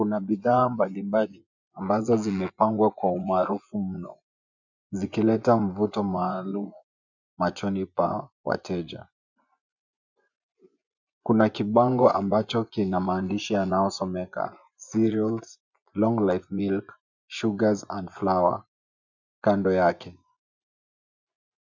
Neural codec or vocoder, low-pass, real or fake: vocoder, 24 kHz, 100 mel bands, Vocos; 7.2 kHz; fake